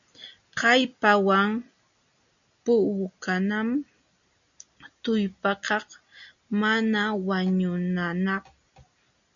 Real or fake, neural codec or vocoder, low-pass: real; none; 7.2 kHz